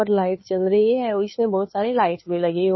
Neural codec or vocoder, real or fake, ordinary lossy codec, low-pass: codec, 16 kHz, 2 kbps, FunCodec, trained on Chinese and English, 25 frames a second; fake; MP3, 24 kbps; 7.2 kHz